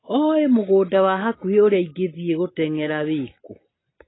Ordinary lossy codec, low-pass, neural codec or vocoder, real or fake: AAC, 16 kbps; 7.2 kHz; none; real